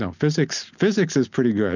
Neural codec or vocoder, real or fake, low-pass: none; real; 7.2 kHz